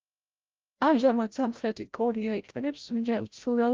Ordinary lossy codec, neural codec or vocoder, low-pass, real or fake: Opus, 24 kbps; codec, 16 kHz, 0.5 kbps, FreqCodec, larger model; 7.2 kHz; fake